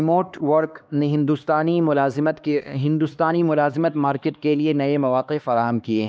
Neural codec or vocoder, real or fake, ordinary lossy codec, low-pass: codec, 16 kHz, 2 kbps, X-Codec, HuBERT features, trained on LibriSpeech; fake; none; none